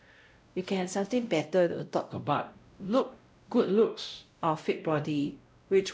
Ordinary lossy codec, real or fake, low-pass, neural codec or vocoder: none; fake; none; codec, 16 kHz, 0.5 kbps, X-Codec, WavLM features, trained on Multilingual LibriSpeech